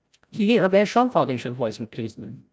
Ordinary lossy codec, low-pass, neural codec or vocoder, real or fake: none; none; codec, 16 kHz, 0.5 kbps, FreqCodec, larger model; fake